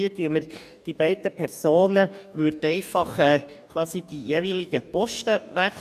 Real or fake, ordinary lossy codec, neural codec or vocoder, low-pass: fake; none; codec, 44.1 kHz, 2.6 kbps, DAC; 14.4 kHz